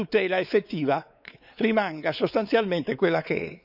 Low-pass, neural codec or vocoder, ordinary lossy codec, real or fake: 5.4 kHz; codec, 16 kHz, 4 kbps, X-Codec, WavLM features, trained on Multilingual LibriSpeech; none; fake